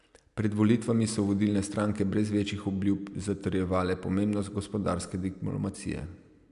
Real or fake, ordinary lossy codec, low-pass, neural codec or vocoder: real; MP3, 96 kbps; 10.8 kHz; none